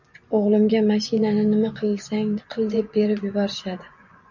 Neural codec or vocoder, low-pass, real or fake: vocoder, 24 kHz, 100 mel bands, Vocos; 7.2 kHz; fake